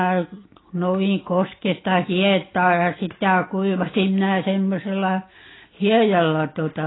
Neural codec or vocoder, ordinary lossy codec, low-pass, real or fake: none; AAC, 16 kbps; 7.2 kHz; real